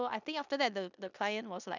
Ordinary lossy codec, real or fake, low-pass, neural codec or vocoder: none; fake; 7.2 kHz; codec, 16 kHz in and 24 kHz out, 0.9 kbps, LongCat-Audio-Codec, four codebook decoder